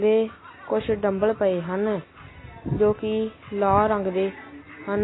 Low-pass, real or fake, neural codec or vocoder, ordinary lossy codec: 7.2 kHz; real; none; AAC, 16 kbps